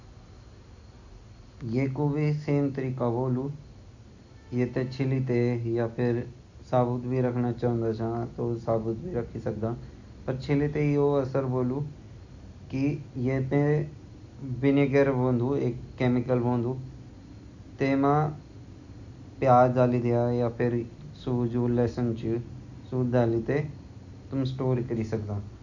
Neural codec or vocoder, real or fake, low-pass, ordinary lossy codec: none; real; 7.2 kHz; none